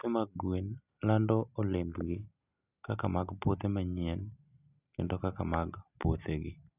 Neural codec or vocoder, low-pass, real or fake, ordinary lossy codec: none; 3.6 kHz; real; none